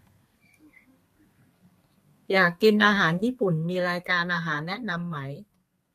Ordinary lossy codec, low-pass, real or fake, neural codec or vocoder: MP3, 64 kbps; 14.4 kHz; fake; codec, 32 kHz, 1.9 kbps, SNAC